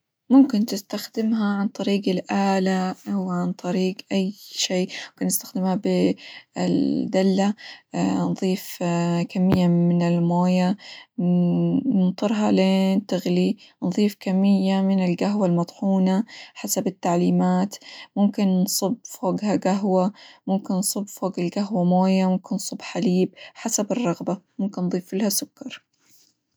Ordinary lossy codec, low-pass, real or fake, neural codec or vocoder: none; none; real; none